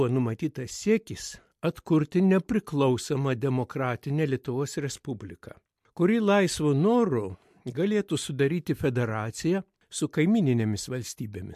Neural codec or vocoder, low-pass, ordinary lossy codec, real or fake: none; 14.4 kHz; MP3, 64 kbps; real